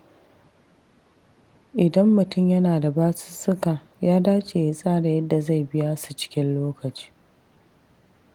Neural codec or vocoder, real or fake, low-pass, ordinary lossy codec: none; real; 19.8 kHz; Opus, 24 kbps